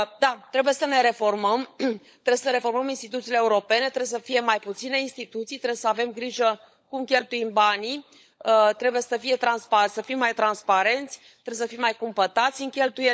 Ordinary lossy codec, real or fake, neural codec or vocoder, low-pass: none; fake; codec, 16 kHz, 16 kbps, FunCodec, trained on LibriTTS, 50 frames a second; none